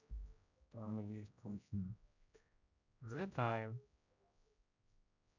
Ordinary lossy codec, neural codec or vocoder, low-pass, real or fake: none; codec, 16 kHz, 0.5 kbps, X-Codec, HuBERT features, trained on general audio; 7.2 kHz; fake